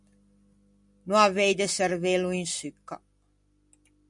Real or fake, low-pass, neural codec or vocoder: real; 10.8 kHz; none